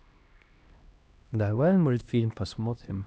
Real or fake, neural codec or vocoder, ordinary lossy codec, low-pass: fake; codec, 16 kHz, 1 kbps, X-Codec, HuBERT features, trained on LibriSpeech; none; none